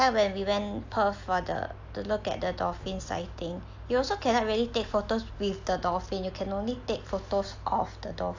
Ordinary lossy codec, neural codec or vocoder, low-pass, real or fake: AAC, 48 kbps; none; 7.2 kHz; real